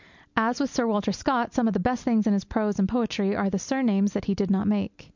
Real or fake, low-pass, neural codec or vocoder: real; 7.2 kHz; none